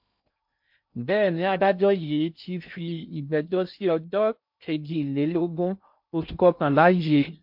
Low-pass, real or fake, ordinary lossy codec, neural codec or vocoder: 5.4 kHz; fake; MP3, 48 kbps; codec, 16 kHz in and 24 kHz out, 0.6 kbps, FocalCodec, streaming, 2048 codes